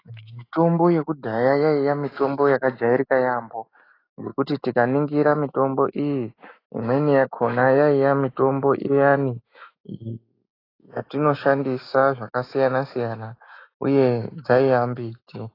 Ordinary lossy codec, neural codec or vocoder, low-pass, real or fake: AAC, 24 kbps; autoencoder, 48 kHz, 128 numbers a frame, DAC-VAE, trained on Japanese speech; 5.4 kHz; fake